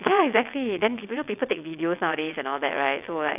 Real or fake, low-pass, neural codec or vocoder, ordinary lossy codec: fake; 3.6 kHz; vocoder, 22.05 kHz, 80 mel bands, WaveNeXt; none